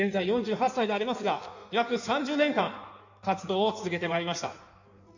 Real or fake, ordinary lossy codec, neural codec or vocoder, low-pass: fake; none; codec, 16 kHz in and 24 kHz out, 1.1 kbps, FireRedTTS-2 codec; 7.2 kHz